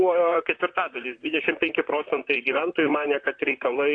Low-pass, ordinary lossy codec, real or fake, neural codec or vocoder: 9.9 kHz; AAC, 48 kbps; fake; vocoder, 44.1 kHz, 128 mel bands, Pupu-Vocoder